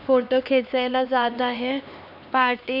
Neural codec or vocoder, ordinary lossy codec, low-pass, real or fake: codec, 16 kHz, 2 kbps, X-Codec, HuBERT features, trained on LibriSpeech; none; 5.4 kHz; fake